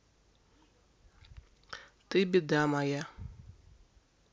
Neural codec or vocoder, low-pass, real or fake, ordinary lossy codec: none; none; real; none